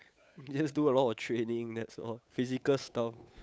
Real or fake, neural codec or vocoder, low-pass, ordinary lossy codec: fake; codec, 16 kHz, 8 kbps, FunCodec, trained on Chinese and English, 25 frames a second; none; none